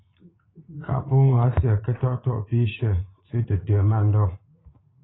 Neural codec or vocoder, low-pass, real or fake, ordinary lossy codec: vocoder, 44.1 kHz, 80 mel bands, Vocos; 7.2 kHz; fake; AAC, 16 kbps